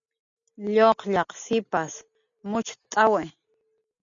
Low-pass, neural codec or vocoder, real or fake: 7.2 kHz; none; real